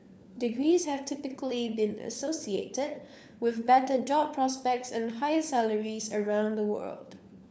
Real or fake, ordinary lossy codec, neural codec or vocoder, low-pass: fake; none; codec, 16 kHz, 4 kbps, FunCodec, trained on LibriTTS, 50 frames a second; none